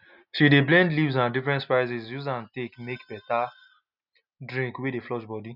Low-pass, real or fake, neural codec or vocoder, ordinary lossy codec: 5.4 kHz; real; none; none